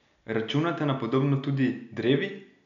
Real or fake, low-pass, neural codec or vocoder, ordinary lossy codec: real; 7.2 kHz; none; none